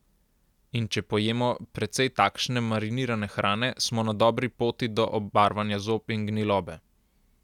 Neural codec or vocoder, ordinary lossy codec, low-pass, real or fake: none; none; 19.8 kHz; real